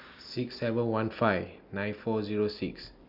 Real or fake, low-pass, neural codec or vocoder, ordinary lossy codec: real; 5.4 kHz; none; none